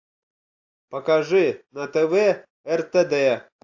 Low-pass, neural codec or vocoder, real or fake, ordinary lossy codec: 7.2 kHz; none; real; AAC, 48 kbps